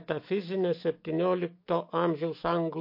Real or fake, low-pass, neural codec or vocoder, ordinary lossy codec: fake; 5.4 kHz; autoencoder, 48 kHz, 128 numbers a frame, DAC-VAE, trained on Japanese speech; MP3, 32 kbps